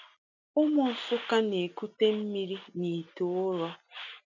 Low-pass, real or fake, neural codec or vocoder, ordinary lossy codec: 7.2 kHz; real; none; none